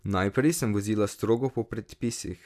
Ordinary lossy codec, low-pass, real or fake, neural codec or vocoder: AAC, 96 kbps; 14.4 kHz; real; none